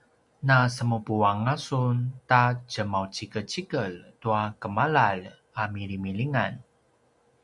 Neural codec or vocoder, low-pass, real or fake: none; 10.8 kHz; real